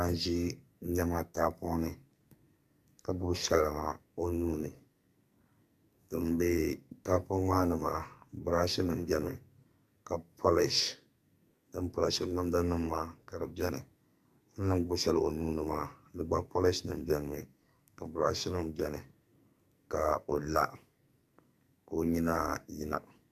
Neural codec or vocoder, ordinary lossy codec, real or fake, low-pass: codec, 44.1 kHz, 2.6 kbps, SNAC; MP3, 96 kbps; fake; 14.4 kHz